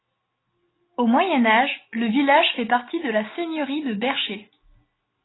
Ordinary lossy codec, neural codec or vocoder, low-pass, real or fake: AAC, 16 kbps; none; 7.2 kHz; real